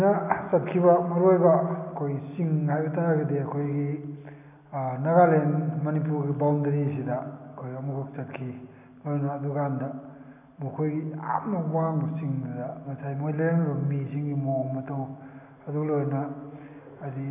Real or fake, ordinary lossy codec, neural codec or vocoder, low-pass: real; none; none; 3.6 kHz